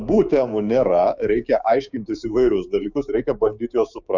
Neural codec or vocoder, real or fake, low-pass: codec, 16 kHz, 6 kbps, DAC; fake; 7.2 kHz